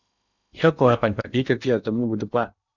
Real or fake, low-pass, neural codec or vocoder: fake; 7.2 kHz; codec, 16 kHz in and 24 kHz out, 0.8 kbps, FocalCodec, streaming, 65536 codes